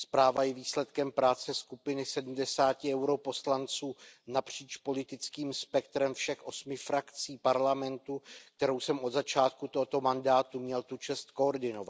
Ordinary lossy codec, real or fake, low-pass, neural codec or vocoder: none; real; none; none